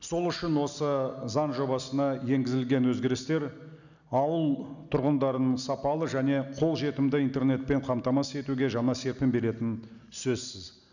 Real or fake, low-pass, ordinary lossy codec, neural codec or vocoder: real; 7.2 kHz; none; none